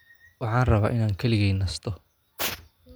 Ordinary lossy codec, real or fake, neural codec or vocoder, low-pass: none; real; none; none